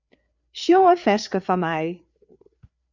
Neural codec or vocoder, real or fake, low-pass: codec, 16 kHz, 4 kbps, FreqCodec, larger model; fake; 7.2 kHz